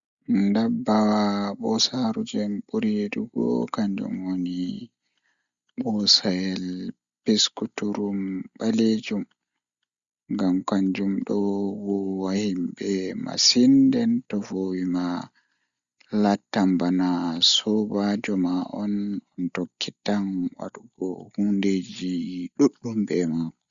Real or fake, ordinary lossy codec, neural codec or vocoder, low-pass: real; Opus, 64 kbps; none; 7.2 kHz